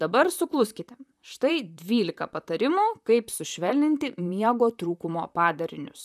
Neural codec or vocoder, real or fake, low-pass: vocoder, 44.1 kHz, 128 mel bands, Pupu-Vocoder; fake; 14.4 kHz